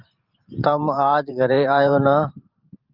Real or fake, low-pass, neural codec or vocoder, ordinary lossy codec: fake; 5.4 kHz; vocoder, 44.1 kHz, 128 mel bands every 512 samples, BigVGAN v2; Opus, 32 kbps